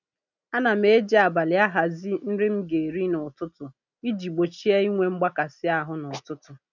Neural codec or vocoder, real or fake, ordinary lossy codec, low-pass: none; real; none; 7.2 kHz